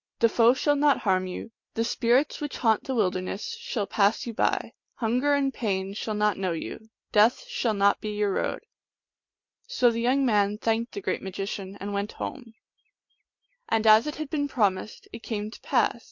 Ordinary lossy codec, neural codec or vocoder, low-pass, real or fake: MP3, 48 kbps; none; 7.2 kHz; real